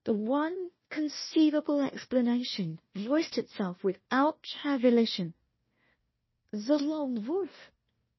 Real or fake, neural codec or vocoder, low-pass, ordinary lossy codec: fake; codec, 16 kHz, 1 kbps, FunCodec, trained on Chinese and English, 50 frames a second; 7.2 kHz; MP3, 24 kbps